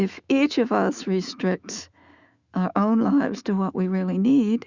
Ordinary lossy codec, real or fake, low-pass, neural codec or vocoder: Opus, 64 kbps; fake; 7.2 kHz; autoencoder, 48 kHz, 128 numbers a frame, DAC-VAE, trained on Japanese speech